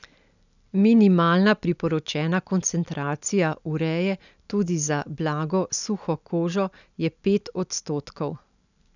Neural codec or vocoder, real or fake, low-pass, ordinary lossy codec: none; real; 7.2 kHz; none